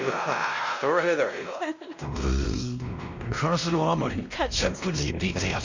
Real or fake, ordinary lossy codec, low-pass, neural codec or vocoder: fake; Opus, 64 kbps; 7.2 kHz; codec, 16 kHz, 1 kbps, X-Codec, WavLM features, trained on Multilingual LibriSpeech